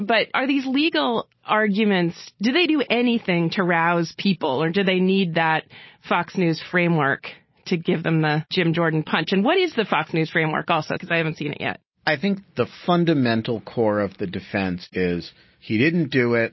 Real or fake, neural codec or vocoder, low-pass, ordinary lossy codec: real; none; 7.2 kHz; MP3, 24 kbps